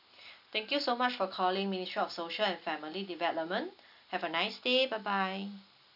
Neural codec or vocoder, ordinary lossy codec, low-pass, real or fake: none; none; 5.4 kHz; real